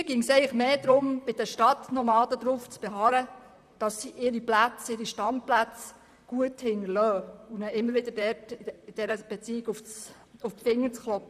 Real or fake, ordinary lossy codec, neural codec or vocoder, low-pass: fake; none; vocoder, 44.1 kHz, 128 mel bands, Pupu-Vocoder; 14.4 kHz